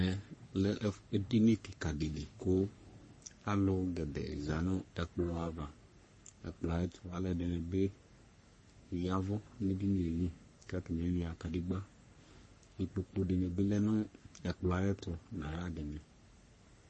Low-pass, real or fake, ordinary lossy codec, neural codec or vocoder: 10.8 kHz; fake; MP3, 32 kbps; codec, 44.1 kHz, 3.4 kbps, Pupu-Codec